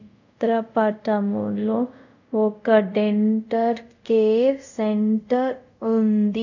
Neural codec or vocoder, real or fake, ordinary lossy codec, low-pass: codec, 24 kHz, 0.5 kbps, DualCodec; fake; none; 7.2 kHz